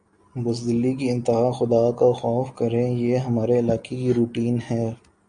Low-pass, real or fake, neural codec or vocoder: 9.9 kHz; real; none